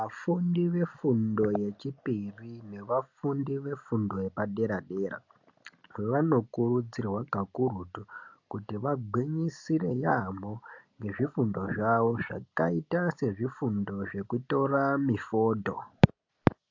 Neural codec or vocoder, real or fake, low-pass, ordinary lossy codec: none; real; 7.2 kHz; AAC, 48 kbps